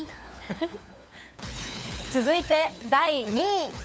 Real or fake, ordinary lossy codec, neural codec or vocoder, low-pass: fake; none; codec, 16 kHz, 4 kbps, FunCodec, trained on LibriTTS, 50 frames a second; none